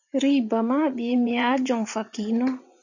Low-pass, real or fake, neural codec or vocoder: 7.2 kHz; fake; vocoder, 44.1 kHz, 80 mel bands, Vocos